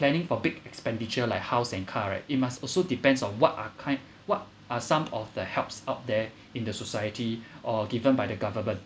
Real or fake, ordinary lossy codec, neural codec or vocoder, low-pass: real; none; none; none